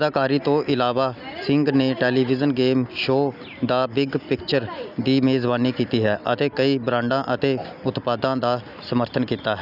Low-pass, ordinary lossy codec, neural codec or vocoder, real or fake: 5.4 kHz; none; none; real